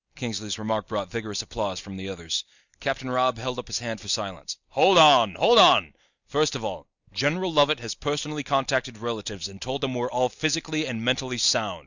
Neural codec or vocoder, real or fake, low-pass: none; real; 7.2 kHz